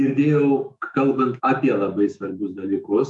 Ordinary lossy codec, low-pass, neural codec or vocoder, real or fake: MP3, 96 kbps; 10.8 kHz; autoencoder, 48 kHz, 128 numbers a frame, DAC-VAE, trained on Japanese speech; fake